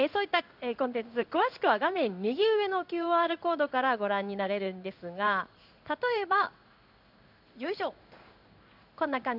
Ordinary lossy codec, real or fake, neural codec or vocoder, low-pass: none; fake; codec, 16 kHz in and 24 kHz out, 1 kbps, XY-Tokenizer; 5.4 kHz